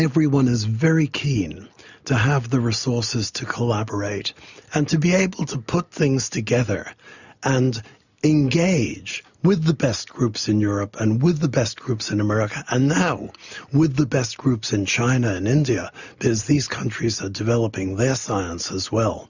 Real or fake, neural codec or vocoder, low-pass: real; none; 7.2 kHz